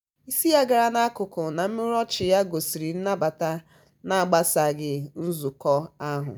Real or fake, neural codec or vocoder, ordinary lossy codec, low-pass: real; none; none; none